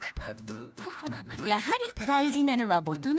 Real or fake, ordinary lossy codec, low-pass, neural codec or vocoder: fake; none; none; codec, 16 kHz, 1 kbps, FunCodec, trained on LibriTTS, 50 frames a second